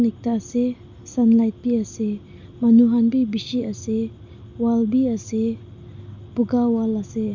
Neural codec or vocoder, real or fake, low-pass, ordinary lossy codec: none; real; 7.2 kHz; none